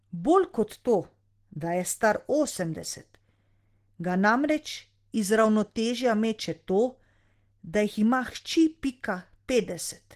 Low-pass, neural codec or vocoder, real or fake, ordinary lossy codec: 14.4 kHz; none; real; Opus, 16 kbps